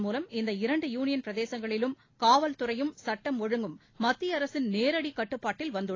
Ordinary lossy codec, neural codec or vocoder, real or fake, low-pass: AAC, 32 kbps; none; real; 7.2 kHz